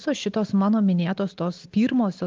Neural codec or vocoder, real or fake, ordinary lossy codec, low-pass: none; real; Opus, 24 kbps; 7.2 kHz